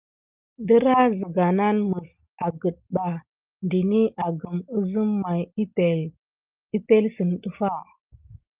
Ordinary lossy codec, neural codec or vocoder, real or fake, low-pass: Opus, 32 kbps; none; real; 3.6 kHz